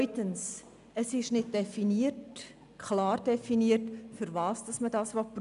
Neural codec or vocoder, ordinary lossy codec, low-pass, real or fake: none; none; 10.8 kHz; real